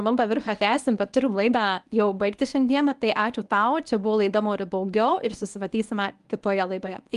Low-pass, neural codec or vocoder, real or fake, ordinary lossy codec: 10.8 kHz; codec, 24 kHz, 0.9 kbps, WavTokenizer, medium speech release version 1; fake; Opus, 32 kbps